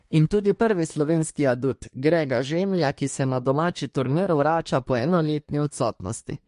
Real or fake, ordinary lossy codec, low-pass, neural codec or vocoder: fake; MP3, 48 kbps; 10.8 kHz; codec, 24 kHz, 1 kbps, SNAC